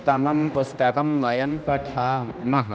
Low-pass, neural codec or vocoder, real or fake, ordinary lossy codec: none; codec, 16 kHz, 1 kbps, X-Codec, HuBERT features, trained on general audio; fake; none